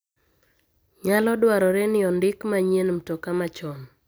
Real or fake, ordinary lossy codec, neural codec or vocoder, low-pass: real; none; none; none